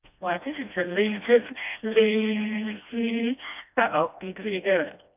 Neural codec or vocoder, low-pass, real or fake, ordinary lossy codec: codec, 16 kHz, 1 kbps, FreqCodec, smaller model; 3.6 kHz; fake; none